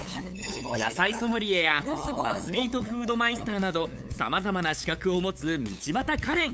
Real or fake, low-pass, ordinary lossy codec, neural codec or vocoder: fake; none; none; codec, 16 kHz, 8 kbps, FunCodec, trained on LibriTTS, 25 frames a second